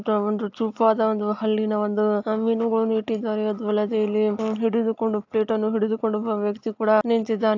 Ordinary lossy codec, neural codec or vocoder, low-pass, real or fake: AAC, 48 kbps; none; 7.2 kHz; real